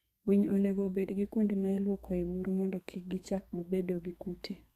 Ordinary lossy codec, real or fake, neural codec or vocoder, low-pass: Opus, 64 kbps; fake; codec, 32 kHz, 1.9 kbps, SNAC; 14.4 kHz